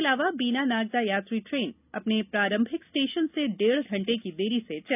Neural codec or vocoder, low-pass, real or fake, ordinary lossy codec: none; 3.6 kHz; real; none